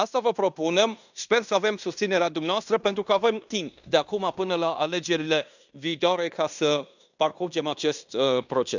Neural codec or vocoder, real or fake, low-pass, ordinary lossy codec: codec, 16 kHz in and 24 kHz out, 0.9 kbps, LongCat-Audio-Codec, fine tuned four codebook decoder; fake; 7.2 kHz; none